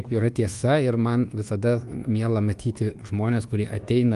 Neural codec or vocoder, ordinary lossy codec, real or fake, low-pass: codec, 24 kHz, 1.2 kbps, DualCodec; Opus, 24 kbps; fake; 10.8 kHz